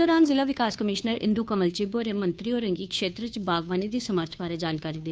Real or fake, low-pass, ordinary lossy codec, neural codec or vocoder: fake; none; none; codec, 16 kHz, 2 kbps, FunCodec, trained on Chinese and English, 25 frames a second